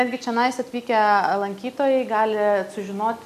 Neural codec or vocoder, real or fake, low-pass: none; real; 14.4 kHz